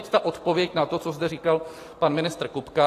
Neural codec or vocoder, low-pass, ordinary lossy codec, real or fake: none; 14.4 kHz; AAC, 48 kbps; real